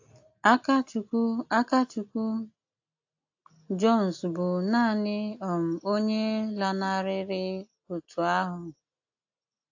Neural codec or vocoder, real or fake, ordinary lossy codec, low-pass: none; real; AAC, 48 kbps; 7.2 kHz